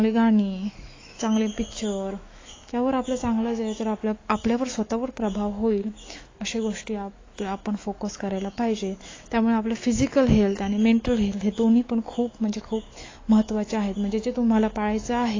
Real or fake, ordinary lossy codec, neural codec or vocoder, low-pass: fake; AAC, 32 kbps; autoencoder, 48 kHz, 128 numbers a frame, DAC-VAE, trained on Japanese speech; 7.2 kHz